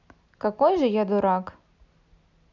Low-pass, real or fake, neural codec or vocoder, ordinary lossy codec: 7.2 kHz; real; none; none